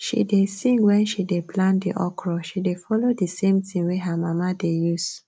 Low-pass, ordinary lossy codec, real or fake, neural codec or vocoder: none; none; real; none